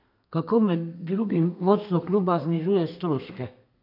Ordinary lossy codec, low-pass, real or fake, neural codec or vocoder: none; 5.4 kHz; fake; codec, 32 kHz, 1.9 kbps, SNAC